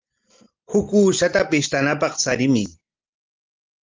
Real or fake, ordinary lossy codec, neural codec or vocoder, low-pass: real; Opus, 32 kbps; none; 7.2 kHz